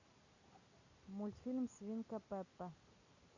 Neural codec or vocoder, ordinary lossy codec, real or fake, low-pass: none; Opus, 64 kbps; real; 7.2 kHz